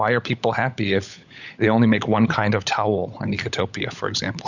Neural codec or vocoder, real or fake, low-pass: codec, 16 kHz, 16 kbps, FunCodec, trained on LibriTTS, 50 frames a second; fake; 7.2 kHz